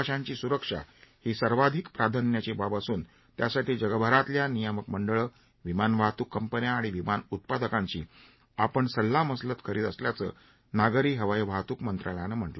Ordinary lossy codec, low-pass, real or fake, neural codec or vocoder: MP3, 24 kbps; 7.2 kHz; real; none